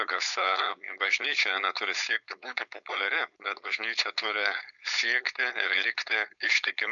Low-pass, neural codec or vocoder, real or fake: 7.2 kHz; codec, 16 kHz, 4.8 kbps, FACodec; fake